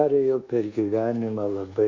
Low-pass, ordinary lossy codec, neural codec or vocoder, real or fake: 7.2 kHz; Opus, 64 kbps; codec, 24 kHz, 1.2 kbps, DualCodec; fake